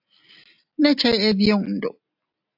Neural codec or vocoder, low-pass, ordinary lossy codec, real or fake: none; 5.4 kHz; AAC, 48 kbps; real